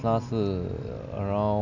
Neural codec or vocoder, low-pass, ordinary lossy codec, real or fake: none; 7.2 kHz; none; real